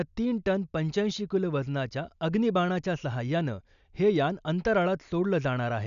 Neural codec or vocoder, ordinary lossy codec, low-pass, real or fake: none; none; 7.2 kHz; real